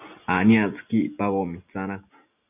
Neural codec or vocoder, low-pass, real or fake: none; 3.6 kHz; real